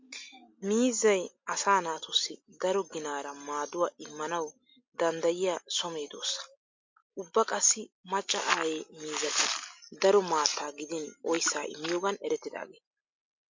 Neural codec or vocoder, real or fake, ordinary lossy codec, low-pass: none; real; MP3, 48 kbps; 7.2 kHz